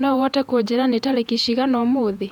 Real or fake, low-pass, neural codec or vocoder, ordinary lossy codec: fake; 19.8 kHz; vocoder, 48 kHz, 128 mel bands, Vocos; none